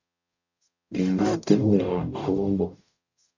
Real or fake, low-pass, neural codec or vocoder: fake; 7.2 kHz; codec, 44.1 kHz, 0.9 kbps, DAC